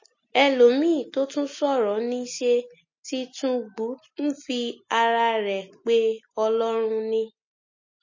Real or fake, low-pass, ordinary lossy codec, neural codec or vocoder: real; 7.2 kHz; MP3, 32 kbps; none